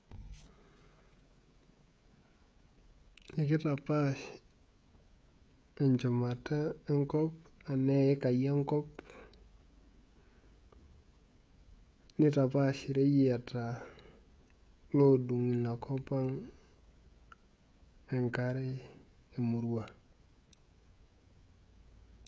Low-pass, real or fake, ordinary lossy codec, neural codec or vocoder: none; fake; none; codec, 16 kHz, 16 kbps, FreqCodec, smaller model